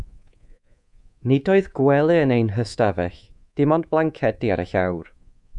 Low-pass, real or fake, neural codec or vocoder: 10.8 kHz; fake; codec, 24 kHz, 3.1 kbps, DualCodec